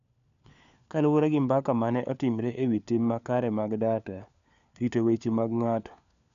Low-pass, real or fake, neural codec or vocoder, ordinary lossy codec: 7.2 kHz; fake; codec, 16 kHz, 4 kbps, FunCodec, trained on LibriTTS, 50 frames a second; none